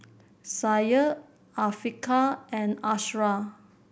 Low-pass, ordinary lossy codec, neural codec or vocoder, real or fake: none; none; none; real